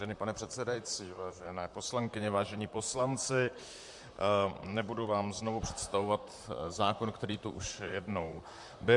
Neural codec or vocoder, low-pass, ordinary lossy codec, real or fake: vocoder, 44.1 kHz, 128 mel bands, Pupu-Vocoder; 10.8 kHz; MP3, 64 kbps; fake